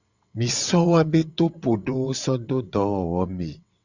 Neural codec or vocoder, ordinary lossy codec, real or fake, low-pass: vocoder, 22.05 kHz, 80 mel bands, WaveNeXt; Opus, 64 kbps; fake; 7.2 kHz